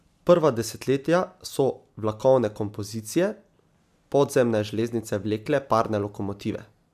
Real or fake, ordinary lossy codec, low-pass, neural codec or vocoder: real; AAC, 96 kbps; 14.4 kHz; none